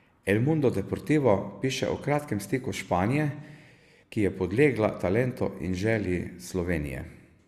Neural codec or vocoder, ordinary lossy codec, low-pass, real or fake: none; Opus, 64 kbps; 14.4 kHz; real